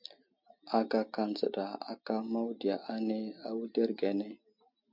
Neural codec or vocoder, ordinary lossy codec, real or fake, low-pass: none; MP3, 48 kbps; real; 5.4 kHz